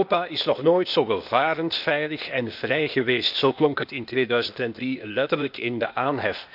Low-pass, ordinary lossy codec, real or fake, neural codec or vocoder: 5.4 kHz; none; fake; codec, 16 kHz, 0.8 kbps, ZipCodec